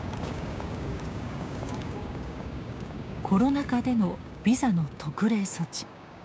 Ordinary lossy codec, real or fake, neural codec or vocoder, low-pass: none; fake; codec, 16 kHz, 6 kbps, DAC; none